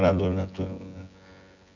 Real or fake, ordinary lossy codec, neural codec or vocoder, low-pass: fake; none; vocoder, 24 kHz, 100 mel bands, Vocos; 7.2 kHz